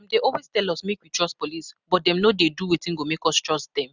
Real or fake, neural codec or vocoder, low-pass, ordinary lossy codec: real; none; 7.2 kHz; none